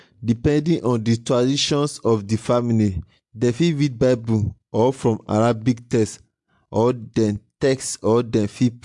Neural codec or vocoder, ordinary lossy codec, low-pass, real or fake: none; MP3, 64 kbps; 10.8 kHz; real